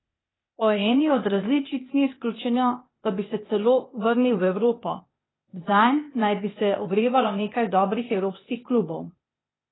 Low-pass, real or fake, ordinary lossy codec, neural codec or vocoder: 7.2 kHz; fake; AAC, 16 kbps; codec, 16 kHz, 0.8 kbps, ZipCodec